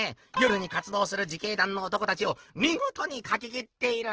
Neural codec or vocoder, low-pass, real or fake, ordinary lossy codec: none; 7.2 kHz; real; Opus, 16 kbps